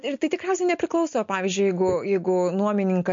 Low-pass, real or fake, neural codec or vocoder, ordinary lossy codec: 7.2 kHz; real; none; MP3, 48 kbps